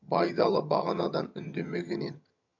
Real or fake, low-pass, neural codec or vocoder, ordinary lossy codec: fake; 7.2 kHz; vocoder, 22.05 kHz, 80 mel bands, HiFi-GAN; none